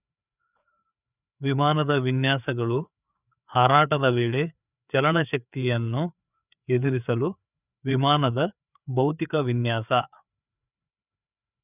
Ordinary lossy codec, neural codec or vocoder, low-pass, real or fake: none; codec, 16 kHz, 4 kbps, FreqCodec, larger model; 3.6 kHz; fake